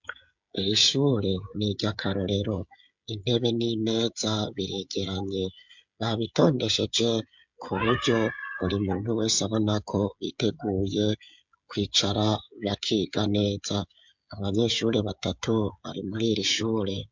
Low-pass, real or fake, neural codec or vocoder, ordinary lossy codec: 7.2 kHz; fake; codec, 16 kHz, 16 kbps, FreqCodec, smaller model; MP3, 64 kbps